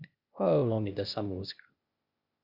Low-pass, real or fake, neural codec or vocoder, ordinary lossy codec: 5.4 kHz; fake; codec, 16 kHz, 0.8 kbps, ZipCodec; Opus, 64 kbps